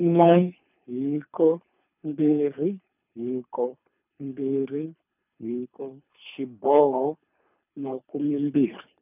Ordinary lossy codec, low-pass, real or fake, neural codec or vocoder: none; 3.6 kHz; fake; codec, 24 kHz, 3 kbps, HILCodec